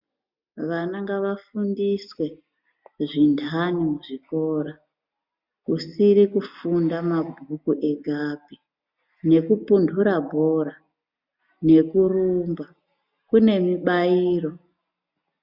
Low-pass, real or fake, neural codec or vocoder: 5.4 kHz; real; none